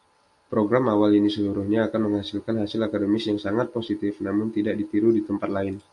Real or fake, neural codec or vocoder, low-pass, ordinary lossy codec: real; none; 10.8 kHz; AAC, 64 kbps